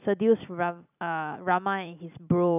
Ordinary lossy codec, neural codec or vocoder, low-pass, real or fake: none; vocoder, 44.1 kHz, 128 mel bands every 256 samples, BigVGAN v2; 3.6 kHz; fake